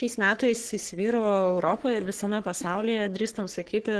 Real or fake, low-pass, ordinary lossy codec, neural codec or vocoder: fake; 10.8 kHz; Opus, 16 kbps; codec, 44.1 kHz, 3.4 kbps, Pupu-Codec